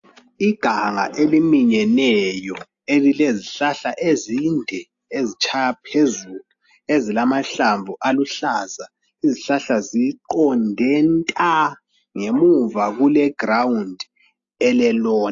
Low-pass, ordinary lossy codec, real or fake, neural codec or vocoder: 7.2 kHz; AAC, 64 kbps; real; none